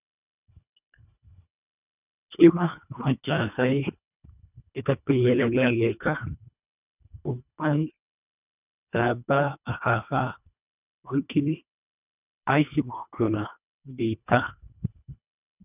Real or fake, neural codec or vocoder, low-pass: fake; codec, 24 kHz, 1.5 kbps, HILCodec; 3.6 kHz